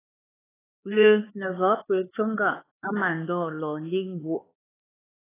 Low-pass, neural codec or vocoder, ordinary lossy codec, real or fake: 3.6 kHz; codec, 16 kHz, 4 kbps, X-Codec, HuBERT features, trained on LibriSpeech; AAC, 16 kbps; fake